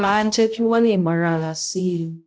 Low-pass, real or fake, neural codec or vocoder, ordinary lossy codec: none; fake; codec, 16 kHz, 0.5 kbps, X-Codec, HuBERT features, trained on balanced general audio; none